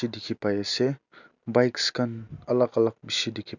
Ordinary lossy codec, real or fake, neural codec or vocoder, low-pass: none; real; none; 7.2 kHz